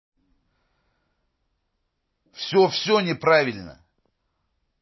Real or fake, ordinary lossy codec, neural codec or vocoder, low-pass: real; MP3, 24 kbps; none; 7.2 kHz